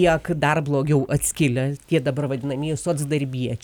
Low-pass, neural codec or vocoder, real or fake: 19.8 kHz; none; real